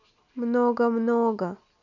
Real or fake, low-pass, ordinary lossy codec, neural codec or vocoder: real; 7.2 kHz; none; none